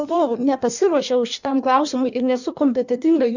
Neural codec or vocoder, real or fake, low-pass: codec, 16 kHz in and 24 kHz out, 1.1 kbps, FireRedTTS-2 codec; fake; 7.2 kHz